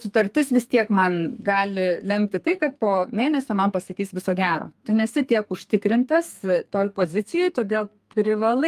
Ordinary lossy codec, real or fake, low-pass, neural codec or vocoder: Opus, 32 kbps; fake; 14.4 kHz; codec, 32 kHz, 1.9 kbps, SNAC